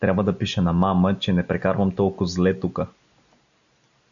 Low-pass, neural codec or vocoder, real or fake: 7.2 kHz; none; real